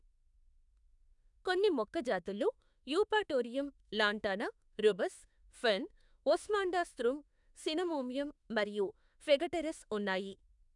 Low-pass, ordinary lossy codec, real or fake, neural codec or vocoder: 10.8 kHz; none; fake; autoencoder, 48 kHz, 32 numbers a frame, DAC-VAE, trained on Japanese speech